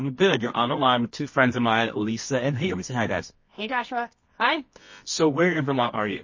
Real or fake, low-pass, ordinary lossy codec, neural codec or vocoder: fake; 7.2 kHz; MP3, 32 kbps; codec, 24 kHz, 0.9 kbps, WavTokenizer, medium music audio release